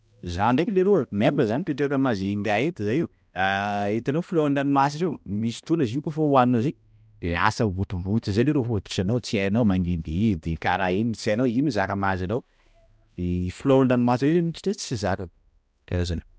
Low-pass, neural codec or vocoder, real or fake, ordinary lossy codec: none; codec, 16 kHz, 1 kbps, X-Codec, HuBERT features, trained on balanced general audio; fake; none